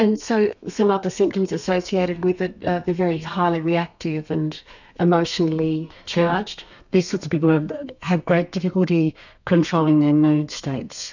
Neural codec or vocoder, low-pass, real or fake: codec, 32 kHz, 1.9 kbps, SNAC; 7.2 kHz; fake